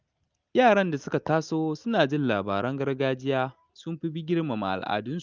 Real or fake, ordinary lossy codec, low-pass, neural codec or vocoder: real; Opus, 24 kbps; 7.2 kHz; none